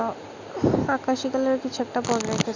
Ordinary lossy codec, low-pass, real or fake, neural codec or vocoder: none; 7.2 kHz; real; none